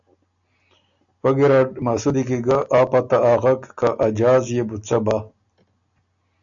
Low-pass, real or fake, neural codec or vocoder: 7.2 kHz; real; none